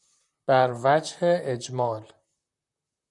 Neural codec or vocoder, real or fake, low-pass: vocoder, 44.1 kHz, 128 mel bands, Pupu-Vocoder; fake; 10.8 kHz